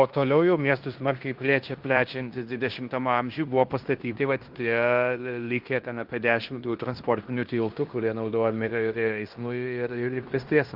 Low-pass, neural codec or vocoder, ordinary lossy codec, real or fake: 5.4 kHz; codec, 16 kHz in and 24 kHz out, 0.9 kbps, LongCat-Audio-Codec, fine tuned four codebook decoder; Opus, 24 kbps; fake